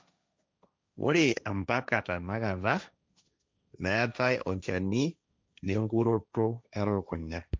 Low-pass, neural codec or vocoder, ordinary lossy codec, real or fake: none; codec, 16 kHz, 1.1 kbps, Voila-Tokenizer; none; fake